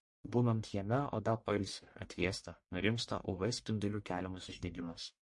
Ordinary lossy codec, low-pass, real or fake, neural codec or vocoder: MP3, 48 kbps; 10.8 kHz; fake; codec, 44.1 kHz, 1.7 kbps, Pupu-Codec